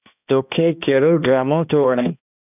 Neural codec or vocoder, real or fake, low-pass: codec, 16 kHz, 1 kbps, X-Codec, HuBERT features, trained on balanced general audio; fake; 3.6 kHz